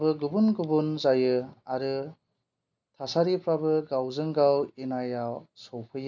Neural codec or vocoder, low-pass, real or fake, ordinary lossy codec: none; 7.2 kHz; real; none